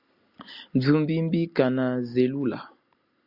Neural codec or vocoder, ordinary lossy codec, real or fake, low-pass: none; Opus, 64 kbps; real; 5.4 kHz